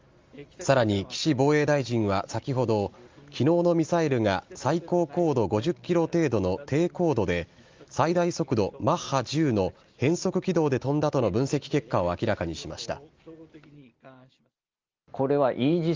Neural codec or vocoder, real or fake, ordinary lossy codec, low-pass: none; real; Opus, 32 kbps; 7.2 kHz